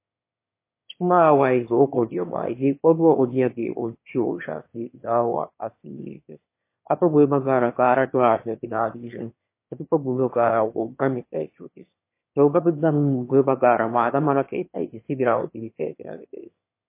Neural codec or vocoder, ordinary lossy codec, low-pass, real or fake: autoencoder, 22.05 kHz, a latent of 192 numbers a frame, VITS, trained on one speaker; MP3, 24 kbps; 3.6 kHz; fake